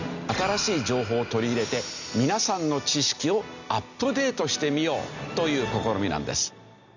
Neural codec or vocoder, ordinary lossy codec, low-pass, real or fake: none; none; 7.2 kHz; real